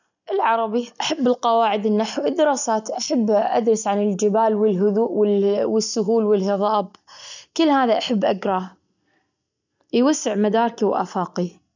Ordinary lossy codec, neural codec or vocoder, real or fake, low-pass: none; none; real; 7.2 kHz